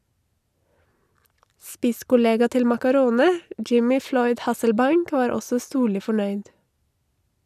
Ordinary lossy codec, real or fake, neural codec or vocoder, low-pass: none; real; none; 14.4 kHz